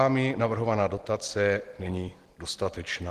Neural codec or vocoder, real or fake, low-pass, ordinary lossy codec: none; real; 14.4 kHz; Opus, 16 kbps